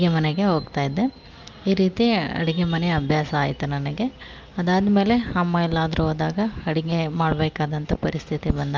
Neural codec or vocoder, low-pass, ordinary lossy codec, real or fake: none; 7.2 kHz; Opus, 32 kbps; real